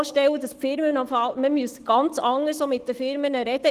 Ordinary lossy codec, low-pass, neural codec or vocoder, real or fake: Opus, 16 kbps; 14.4 kHz; autoencoder, 48 kHz, 128 numbers a frame, DAC-VAE, trained on Japanese speech; fake